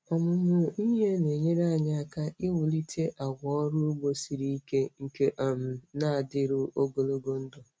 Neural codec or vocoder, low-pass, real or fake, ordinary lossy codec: none; none; real; none